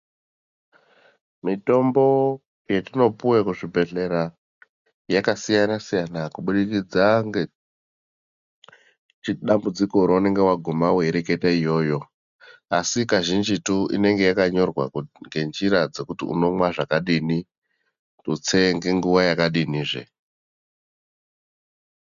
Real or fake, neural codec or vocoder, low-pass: real; none; 7.2 kHz